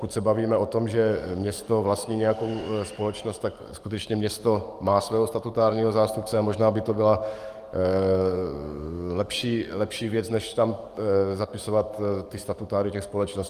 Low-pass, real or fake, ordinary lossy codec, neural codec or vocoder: 14.4 kHz; fake; Opus, 32 kbps; codec, 44.1 kHz, 7.8 kbps, DAC